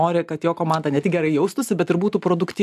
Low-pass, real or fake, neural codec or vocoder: 14.4 kHz; real; none